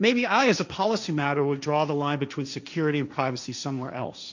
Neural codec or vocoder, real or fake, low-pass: codec, 16 kHz, 1.1 kbps, Voila-Tokenizer; fake; 7.2 kHz